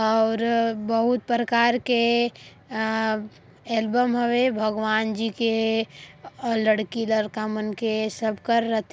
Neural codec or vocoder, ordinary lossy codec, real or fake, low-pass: none; none; real; none